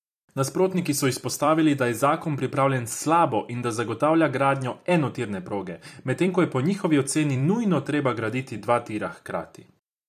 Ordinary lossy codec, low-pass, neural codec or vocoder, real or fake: MP3, 96 kbps; 14.4 kHz; vocoder, 44.1 kHz, 128 mel bands every 256 samples, BigVGAN v2; fake